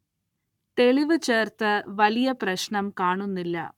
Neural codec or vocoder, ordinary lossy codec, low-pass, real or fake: codec, 44.1 kHz, 7.8 kbps, Pupu-Codec; none; 19.8 kHz; fake